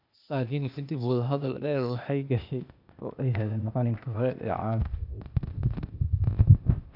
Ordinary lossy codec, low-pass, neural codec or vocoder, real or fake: none; 5.4 kHz; codec, 16 kHz, 0.8 kbps, ZipCodec; fake